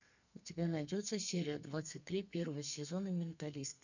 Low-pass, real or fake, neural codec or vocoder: 7.2 kHz; fake; codec, 32 kHz, 1.9 kbps, SNAC